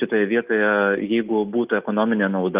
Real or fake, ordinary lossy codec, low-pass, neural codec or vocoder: real; Opus, 32 kbps; 3.6 kHz; none